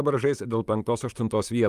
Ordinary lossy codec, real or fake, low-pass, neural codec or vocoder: Opus, 32 kbps; fake; 14.4 kHz; vocoder, 44.1 kHz, 128 mel bands, Pupu-Vocoder